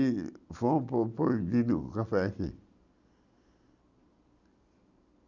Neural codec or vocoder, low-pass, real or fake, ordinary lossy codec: none; 7.2 kHz; real; none